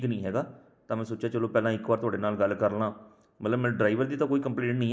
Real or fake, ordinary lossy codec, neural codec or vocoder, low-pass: real; none; none; none